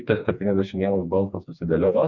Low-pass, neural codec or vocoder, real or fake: 7.2 kHz; codec, 16 kHz, 2 kbps, FreqCodec, smaller model; fake